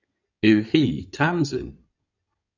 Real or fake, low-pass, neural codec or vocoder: fake; 7.2 kHz; codec, 16 kHz in and 24 kHz out, 2.2 kbps, FireRedTTS-2 codec